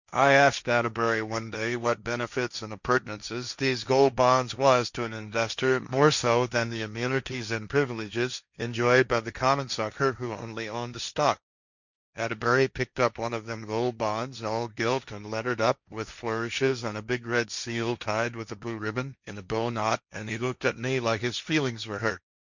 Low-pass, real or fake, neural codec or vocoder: 7.2 kHz; fake; codec, 16 kHz, 1.1 kbps, Voila-Tokenizer